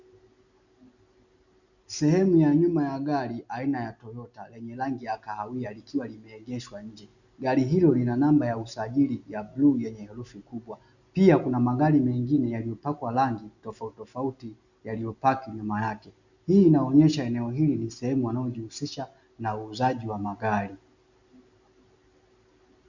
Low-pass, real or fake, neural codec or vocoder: 7.2 kHz; real; none